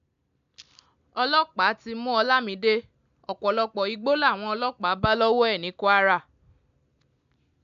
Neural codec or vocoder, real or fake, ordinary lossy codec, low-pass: none; real; MP3, 64 kbps; 7.2 kHz